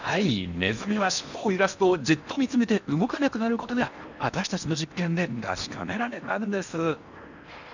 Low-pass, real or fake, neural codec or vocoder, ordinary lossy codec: 7.2 kHz; fake; codec, 16 kHz in and 24 kHz out, 0.8 kbps, FocalCodec, streaming, 65536 codes; none